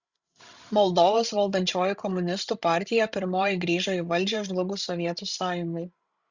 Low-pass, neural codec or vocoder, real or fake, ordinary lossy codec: 7.2 kHz; codec, 16 kHz, 16 kbps, FreqCodec, larger model; fake; Opus, 64 kbps